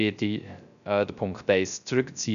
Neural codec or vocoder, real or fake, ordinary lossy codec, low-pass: codec, 16 kHz, 0.3 kbps, FocalCodec; fake; none; 7.2 kHz